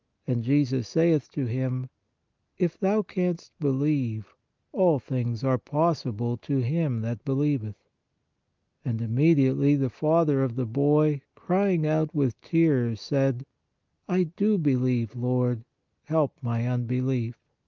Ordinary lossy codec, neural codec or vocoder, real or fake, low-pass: Opus, 24 kbps; none; real; 7.2 kHz